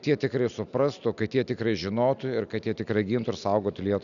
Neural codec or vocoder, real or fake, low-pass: none; real; 7.2 kHz